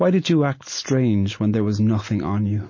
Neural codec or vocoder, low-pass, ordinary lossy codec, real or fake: none; 7.2 kHz; MP3, 32 kbps; real